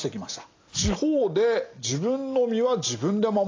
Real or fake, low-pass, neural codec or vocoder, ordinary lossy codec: real; 7.2 kHz; none; AAC, 32 kbps